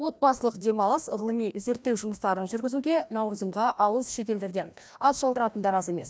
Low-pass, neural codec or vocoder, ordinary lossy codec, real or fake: none; codec, 16 kHz, 1 kbps, FreqCodec, larger model; none; fake